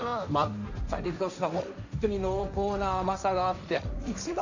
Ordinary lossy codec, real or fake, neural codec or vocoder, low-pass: none; fake; codec, 16 kHz, 1.1 kbps, Voila-Tokenizer; 7.2 kHz